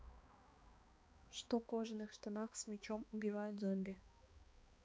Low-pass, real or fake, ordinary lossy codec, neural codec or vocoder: none; fake; none; codec, 16 kHz, 2 kbps, X-Codec, HuBERT features, trained on balanced general audio